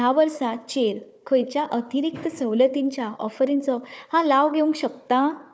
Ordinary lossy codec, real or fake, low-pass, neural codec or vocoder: none; fake; none; codec, 16 kHz, 4 kbps, FunCodec, trained on Chinese and English, 50 frames a second